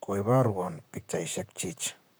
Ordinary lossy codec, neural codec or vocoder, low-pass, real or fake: none; vocoder, 44.1 kHz, 128 mel bands, Pupu-Vocoder; none; fake